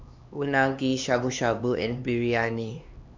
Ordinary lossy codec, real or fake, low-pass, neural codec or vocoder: MP3, 64 kbps; fake; 7.2 kHz; codec, 16 kHz, 2 kbps, X-Codec, HuBERT features, trained on LibriSpeech